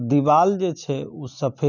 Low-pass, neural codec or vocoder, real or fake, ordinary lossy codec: 7.2 kHz; none; real; none